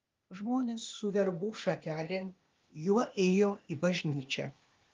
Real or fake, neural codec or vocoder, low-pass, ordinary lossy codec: fake; codec, 16 kHz, 0.8 kbps, ZipCodec; 7.2 kHz; Opus, 32 kbps